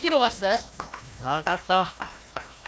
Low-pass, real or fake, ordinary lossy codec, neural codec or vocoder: none; fake; none; codec, 16 kHz, 1 kbps, FunCodec, trained on Chinese and English, 50 frames a second